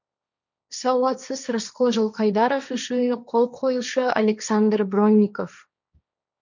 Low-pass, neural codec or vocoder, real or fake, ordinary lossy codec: 7.2 kHz; codec, 16 kHz, 1.1 kbps, Voila-Tokenizer; fake; none